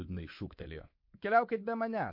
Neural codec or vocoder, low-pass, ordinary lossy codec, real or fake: codec, 24 kHz, 3.1 kbps, DualCodec; 5.4 kHz; MP3, 32 kbps; fake